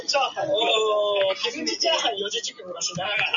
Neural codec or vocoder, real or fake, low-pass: none; real; 7.2 kHz